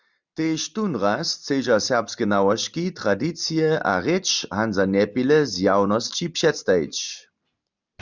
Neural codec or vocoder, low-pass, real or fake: none; 7.2 kHz; real